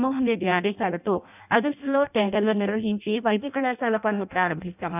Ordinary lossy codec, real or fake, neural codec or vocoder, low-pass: none; fake; codec, 16 kHz in and 24 kHz out, 0.6 kbps, FireRedTTS-2 codec; 3.6 kHz